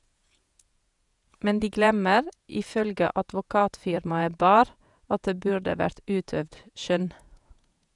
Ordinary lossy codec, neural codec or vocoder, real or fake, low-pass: none; vocoder, 48 kHz, 128 mel bands, Vocos; fake; 10.8 kHz